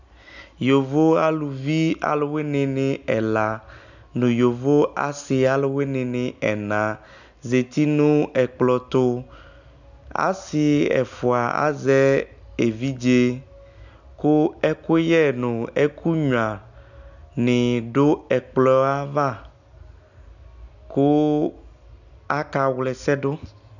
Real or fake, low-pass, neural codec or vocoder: real; 7.2 kHz; none